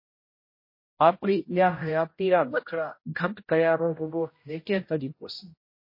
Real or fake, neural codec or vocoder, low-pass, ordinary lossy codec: fake; codec, 16 kHz, 0.5 kbps, X-Codec, HuBERT features, trained on general audio; 5.4 kHz; MP3, 24 kbps